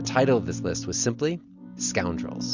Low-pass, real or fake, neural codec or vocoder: 7.2 kHz; real; none